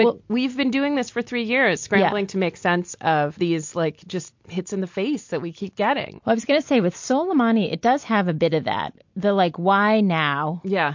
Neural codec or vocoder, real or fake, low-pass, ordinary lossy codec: none; real; 7.2 kHz; MP3, 48 kbps